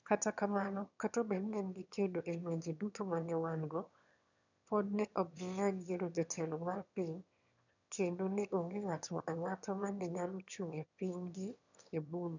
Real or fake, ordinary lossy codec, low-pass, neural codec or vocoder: fake; none; 7.2 kHz; autoencoder, 22.05 kHz, a latent of 192 numbers a frame, VITS, trained on one speaker